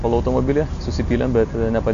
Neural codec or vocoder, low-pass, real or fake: none; 7.2 kHz; real